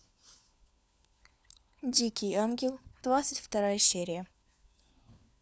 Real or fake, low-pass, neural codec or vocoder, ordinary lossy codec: fake; none; codec, 16 kHz, 4 kbps, FunCodec, trained on LibriTTS, 50 frames a second; none